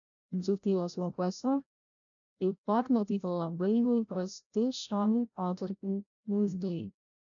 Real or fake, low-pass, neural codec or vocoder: fake; 7.2 kHz; codec, 16 kHz, 0.5 kbps, FreqCodec, larger model